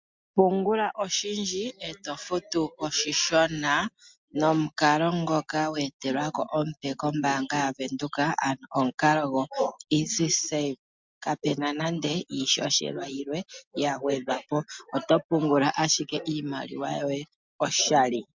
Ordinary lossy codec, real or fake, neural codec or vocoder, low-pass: MP3, 64 kbps; real; none; 7.2 kHz